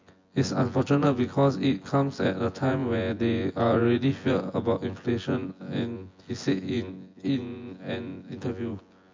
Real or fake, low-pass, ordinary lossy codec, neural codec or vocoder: fake; 7.2 kHz; MP3, 48 kbps; vocoder, 24 kHz, 100 mel bands, Vocos